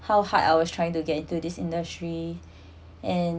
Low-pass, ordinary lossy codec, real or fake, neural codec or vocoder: none; none; real; none